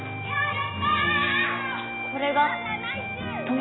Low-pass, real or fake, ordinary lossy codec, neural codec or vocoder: 7.2 kHz; real; AAC, 16 kbps; none